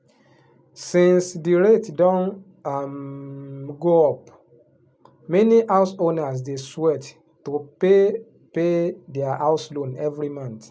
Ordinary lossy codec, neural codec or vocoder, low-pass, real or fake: none; none; none; real